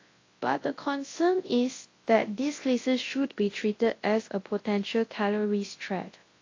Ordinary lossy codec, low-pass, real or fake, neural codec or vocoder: AAC, 32 kbps; 7.2 kHz; fake; codec, 24 kHz, 0.9 kbps, WavTokenizer, large speech release